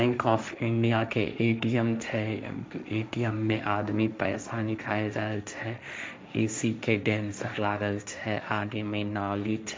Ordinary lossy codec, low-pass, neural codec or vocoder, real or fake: none; none; codec, 16 kHz, 1.1 kbps, Voila-Tokenizer; fake